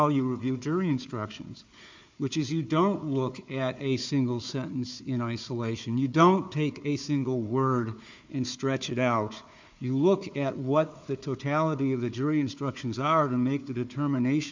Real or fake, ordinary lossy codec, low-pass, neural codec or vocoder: fake; MP3, 64 kbps; 7.2 kHz; codec, 16 kHz, 4 kbps, FunCodec, trained on Chinese and English, 50 frames a second